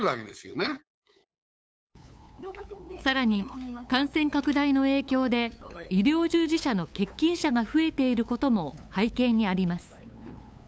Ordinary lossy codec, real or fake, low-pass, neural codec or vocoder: none; fake; none; codec, 16 kHz, 8 kbps, FunCodec, trained on LibriTTS, 25 frames a second